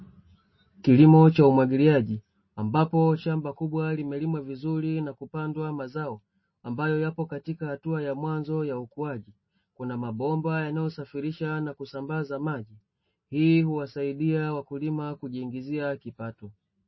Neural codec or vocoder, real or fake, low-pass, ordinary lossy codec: none; real; 7.2 kHz; MP3, 24 kbps